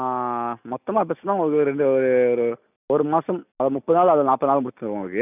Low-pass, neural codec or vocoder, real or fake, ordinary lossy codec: 3.6 kHz; none; real; none